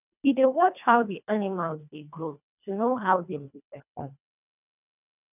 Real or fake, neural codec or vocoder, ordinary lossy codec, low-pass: fake; codec, 24 kHz, 1.5 kbps, HILCodec; none; 3.6 kHz